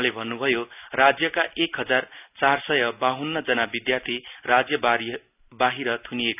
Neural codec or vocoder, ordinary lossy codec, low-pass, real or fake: none; none; 3.6 kHz; real